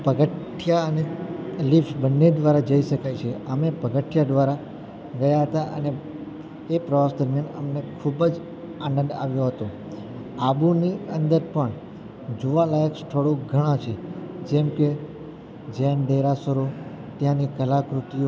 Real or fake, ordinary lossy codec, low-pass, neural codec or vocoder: real; none; none; none